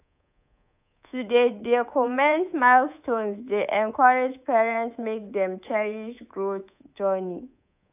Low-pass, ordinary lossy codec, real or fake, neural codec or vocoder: 3.6 kHz; AAC, 32 kbps; fake; codec, 24 kHz, 3.1 kbps, DualCodec